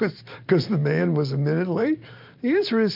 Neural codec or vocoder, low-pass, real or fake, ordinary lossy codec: vocoder, 44.1 kHz, 128 mel bands every 512 samples, BigVGAN v2; 5.4 kHz; fake; MP3, 48 kbps